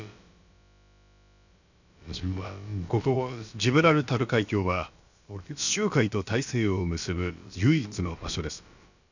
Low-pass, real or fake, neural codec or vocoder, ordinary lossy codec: 7.2 kHz; fake; codec, 16 kHz, about 1 kbps, DyCAST, with the encoder's durations; none